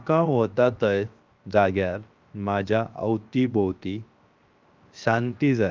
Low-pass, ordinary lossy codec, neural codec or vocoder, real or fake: 7.2 kHz; Opus, 24 kbps; codec, 16 kHz, 0.7 kbps, FocalCodec; fake